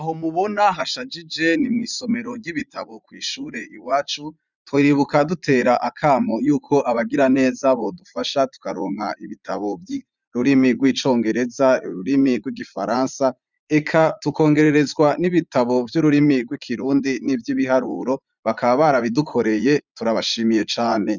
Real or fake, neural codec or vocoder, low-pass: fake; vocoder, 44.1 kHz, 80 mel bands, Vocos; 7.2 kHz